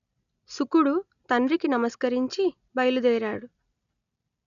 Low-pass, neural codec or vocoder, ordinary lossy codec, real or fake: 7.2 kHz; none; none; real